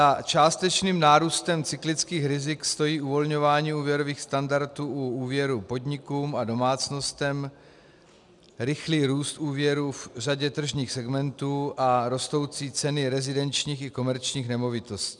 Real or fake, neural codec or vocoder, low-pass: real; none; 10.8 kHz